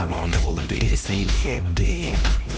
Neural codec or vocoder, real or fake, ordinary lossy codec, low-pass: codec, 16 kHz, 1 kbps, X-Codec, HuBERT features, trained on LibriSpeech; fake; none; none